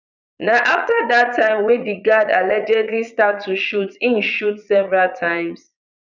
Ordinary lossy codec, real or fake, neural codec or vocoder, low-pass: none; fake; vocoder, 22.05 kHz, 80 mel bands, Vocos; 7.2 kHz